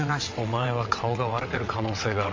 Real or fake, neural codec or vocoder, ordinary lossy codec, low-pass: fake; vocoder, 22.05 kHz, 80 mel bands, WaveNeXt; MP3, 48 kbps; 7.2 kHz